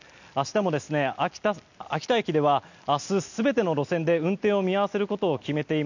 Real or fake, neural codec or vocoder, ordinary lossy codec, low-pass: real; none; none; 7.2 kHz